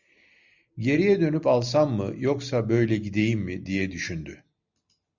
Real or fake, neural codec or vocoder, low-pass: real; none; 7.2 kHz